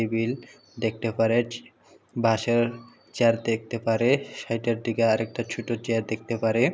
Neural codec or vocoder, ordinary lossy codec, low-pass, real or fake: none; none; none; real